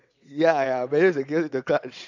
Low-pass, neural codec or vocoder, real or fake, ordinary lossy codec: 7.2 kHz; vocoder, 22.05 kHz, 80 mel bands, WaveNeXt; fake; none